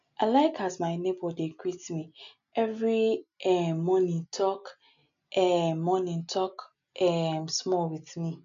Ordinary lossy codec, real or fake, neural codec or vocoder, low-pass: MP3, 64 kbps; real; none; 7.2 kHz